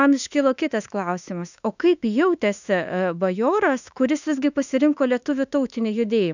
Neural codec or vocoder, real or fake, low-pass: autoencoder, 48 kHz, 32 numbers a frame, DAC-VAE, trained on Japanese speech; fake; 7.2 kHz